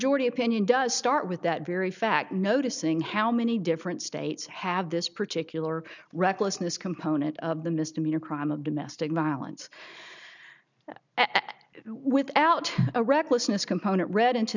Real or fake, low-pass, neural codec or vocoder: real; 7.2 kHz; none